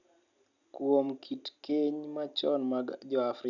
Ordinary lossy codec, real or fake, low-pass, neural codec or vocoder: none; real; 7.2 kHz; none